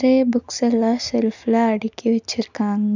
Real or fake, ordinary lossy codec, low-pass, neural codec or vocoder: real; none; 7.2 kHz; none